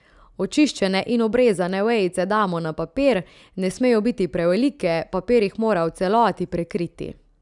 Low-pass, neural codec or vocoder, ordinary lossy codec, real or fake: 10.8 kHz; none; none; real